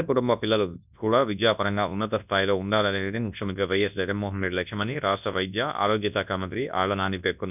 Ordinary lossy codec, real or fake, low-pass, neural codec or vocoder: none; fake; 3.6 kHz; codec, 24 kHz, 0.9 kbps, WavTokenizer, large speech release